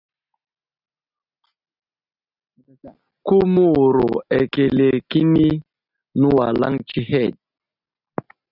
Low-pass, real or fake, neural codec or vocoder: 5.4 kHz; real; none